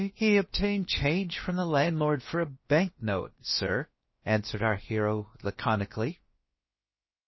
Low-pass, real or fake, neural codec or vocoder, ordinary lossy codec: 7.2 kHz; fake; codec, 16 kHz, about 1 kbps, DyCAST, with the encoder's durations; MP3, 24 kbps